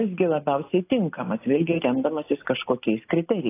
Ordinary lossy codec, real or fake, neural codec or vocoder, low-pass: AAC, 24 kbps; real; none; 3.6 kHz